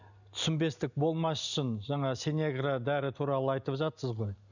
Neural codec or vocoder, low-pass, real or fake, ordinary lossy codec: none; 7.2 kHz; real; none